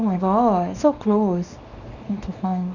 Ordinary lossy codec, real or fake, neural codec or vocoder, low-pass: none; fake; codec, 24 kHz, 0.9 kbps, WavTokenizer, small release; 7.2 kHz